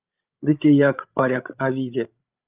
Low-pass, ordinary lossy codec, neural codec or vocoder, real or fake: 3.6 kHz; Opus, 24 kbps; codec, 16 kHz, 16 kbps, FreqCodec, larger model; fake